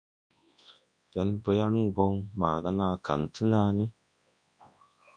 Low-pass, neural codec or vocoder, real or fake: 9.9 kHz; codec, 24 kHz, 0.9 kbps, WavTokenizer, large speech release; fake